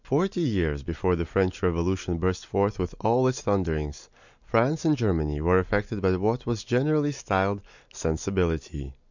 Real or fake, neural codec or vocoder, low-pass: real; none; 7.2 kHz